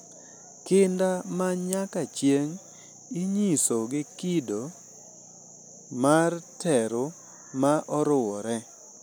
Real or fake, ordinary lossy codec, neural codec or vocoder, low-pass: real; none; none; none